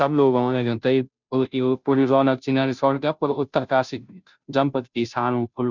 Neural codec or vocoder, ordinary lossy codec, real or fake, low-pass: codec, 16 kHz, 0.5 kbps, FunCodec, trained on Chinese and English, 25 frames a second; none; fake; 7.2 kHz